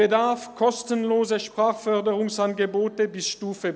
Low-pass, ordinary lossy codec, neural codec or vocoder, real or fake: none; none; none; real